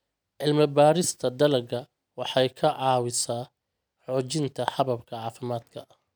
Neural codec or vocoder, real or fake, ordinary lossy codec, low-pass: none; real; none; none